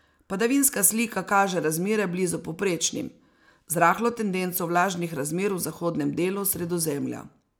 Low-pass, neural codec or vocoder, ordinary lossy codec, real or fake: none; none; none; real